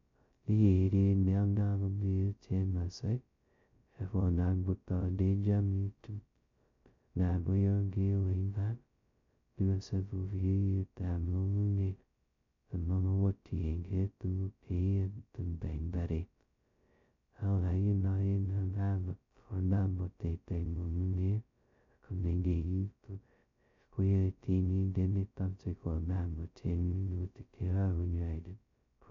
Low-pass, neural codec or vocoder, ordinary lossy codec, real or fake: 7.2 kHz; codec, 16 kHz, 0.2 kbps, FocalCodec; MP3, 48 kbps; fake